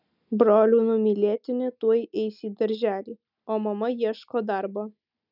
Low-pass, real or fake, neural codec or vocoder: 5.4 kHz; real; none